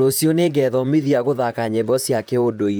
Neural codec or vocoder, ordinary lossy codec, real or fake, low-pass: vocoder, 44.1 kHz, 128 mel bands, Pupu-Vocoder; none; fake; none